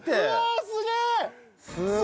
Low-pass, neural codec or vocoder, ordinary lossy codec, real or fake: none; none; none; real